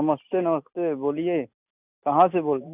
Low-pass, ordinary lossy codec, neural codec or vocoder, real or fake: 3.6 kHz; none; none; real